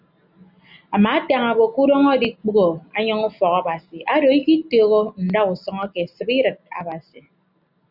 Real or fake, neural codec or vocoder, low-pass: real; none; 5.4 kHz